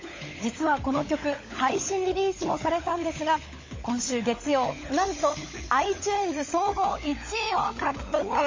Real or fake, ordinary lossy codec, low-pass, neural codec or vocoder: fake; MP3, 32 kbps; 7.2 kHz; codec, 16 kHz, 16 kbps, FunCodec, trained on LibriTTS, 50 frames a second